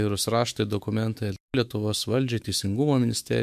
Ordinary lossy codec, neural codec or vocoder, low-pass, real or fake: MP3, 96 kbps; autoencoder, 48 kHz, 128 numbers a frame, DAC-VAE, trained on Japanese speech; 14.4 kHz; fake